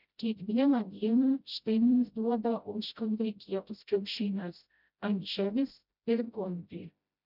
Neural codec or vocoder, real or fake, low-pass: codec, 16 kHz, 0.5 kbps, FreqCodec, smaller model; fake; 5.4 kHz